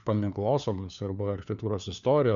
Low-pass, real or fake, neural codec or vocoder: 7.2 kHz; fake; codec, 16 kHz, 4 kbps, FunCodec, trained on LibriTTS, 50 frames a second